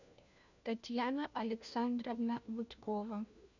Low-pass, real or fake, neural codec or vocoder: 7.2 kHz; fake; codec, 16 kHz, 1 kbps, FunCodec, trained on LibriTTS, 50 frames a second